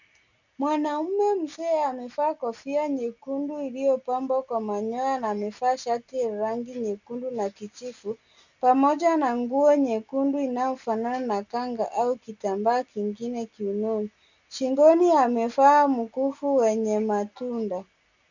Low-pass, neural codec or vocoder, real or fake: 7.2 kHz; none; real